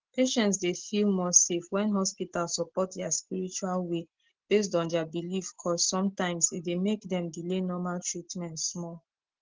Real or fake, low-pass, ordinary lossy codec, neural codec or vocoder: real; 7.2 kHz; Opus, 16 kbps; none